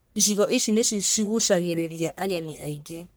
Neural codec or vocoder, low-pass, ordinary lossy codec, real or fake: codec, 44.1 kHz, 1.7 kbps, Pupu-Codec; none; none; fake